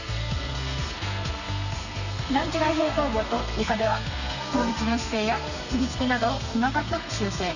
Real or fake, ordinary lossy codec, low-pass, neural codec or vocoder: fake; none; 7.2 kHz; codec, 32 kHz, 1.9 kbps, SNAC